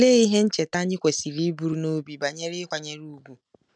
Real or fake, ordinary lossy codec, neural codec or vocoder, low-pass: real; none; none; 9.9 kHz